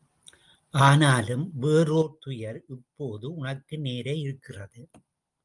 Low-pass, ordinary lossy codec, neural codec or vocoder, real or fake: 10.8 kHz; Opus, 32 kbps; none; real